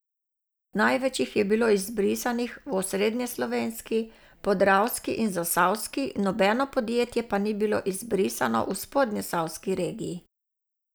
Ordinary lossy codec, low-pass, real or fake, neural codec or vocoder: none; none; real; none